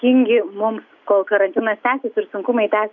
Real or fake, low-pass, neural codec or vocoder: real; 7.2 kHz; none